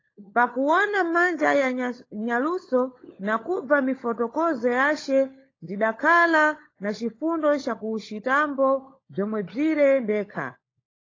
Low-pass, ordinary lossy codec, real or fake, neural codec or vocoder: 7.2 kHz; AAC, 32 kbps; fake; codec, 16 kHz, 16 kbps, FunCodec, trained on LibriTTS, 50 frames a second